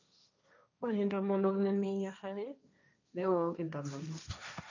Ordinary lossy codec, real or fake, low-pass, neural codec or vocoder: none; fake; 7.2 kHz; codec, 16 kHz, 1.1 kbps, Voila-Tokenizer